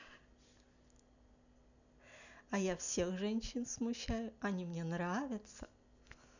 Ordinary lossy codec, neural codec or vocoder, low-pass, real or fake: none; none; 7.2 kHz; real